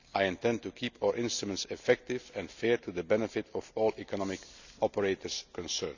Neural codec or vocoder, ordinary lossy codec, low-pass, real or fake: none; none; 7.2 kHz; real